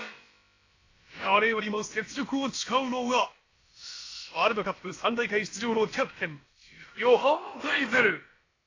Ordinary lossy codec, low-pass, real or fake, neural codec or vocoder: AAC, 32 kbps; 7.2 kHz; fake; codec, 16 kHz, about 1 kbps, DyCAST, with the encoder's durations